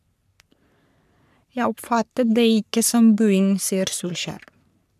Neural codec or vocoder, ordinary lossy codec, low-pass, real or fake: codec, 44.1 kHz, 3.4 kbps, Pupu-Codec; none; 14.4 kHz; fake